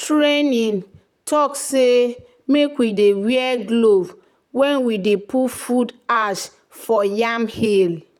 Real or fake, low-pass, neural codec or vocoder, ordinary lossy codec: fake; 19.8 kHz; vocoder, 44.1 kHz, 128 mel bands, Pupu-Vocoder; none